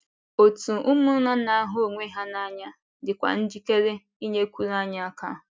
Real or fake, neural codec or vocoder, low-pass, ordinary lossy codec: real; none; none; none